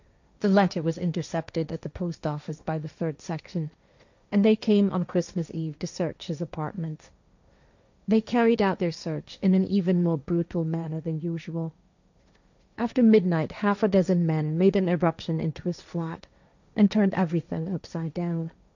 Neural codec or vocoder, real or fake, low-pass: codec, 16 kHz, 1.1 kbps, Voila-Tokenizer; fake; 7.2 kHz